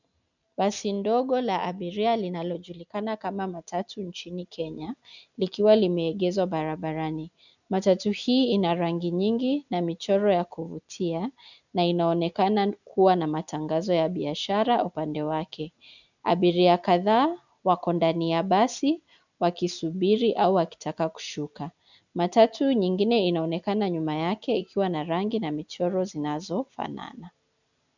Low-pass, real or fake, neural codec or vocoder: 7.2 kHz; real; none